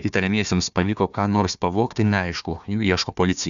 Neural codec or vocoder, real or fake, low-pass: codec, 16 kHz, 1 kbps, FunCodec, trained on Chinese and English, 50 frames a second; fake; 7.2 kHz